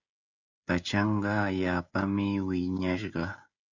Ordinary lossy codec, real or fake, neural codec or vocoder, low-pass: Opus, 64 kbps; fake; codec, 16 kHz, 16 kbps, FreqCodec, smaller model; 7.2 kHz